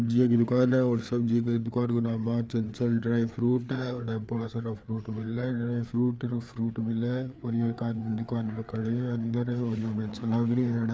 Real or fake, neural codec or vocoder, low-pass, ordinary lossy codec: fake; codec, 16 kHz, 4 kbps, FreqCodec, larger model; none; none